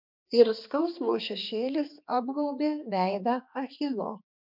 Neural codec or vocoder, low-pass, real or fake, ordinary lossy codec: codec, 16 kHz, 2 kbps, X-Codec, HuBERT features, trained on balanced general audio; 5.4 kHz; fake; MP3, 48 kbps